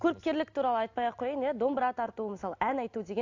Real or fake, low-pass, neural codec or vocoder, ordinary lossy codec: real; 7.2 kHz; none; AAC, 48 kbps